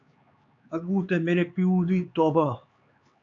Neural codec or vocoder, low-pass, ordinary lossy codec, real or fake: codec, 16 kHz, 4 kbps, X-Codec, HuBERT features, trained on LibriSpeech; 7.2 kHz; AAC, 48 kbps; fake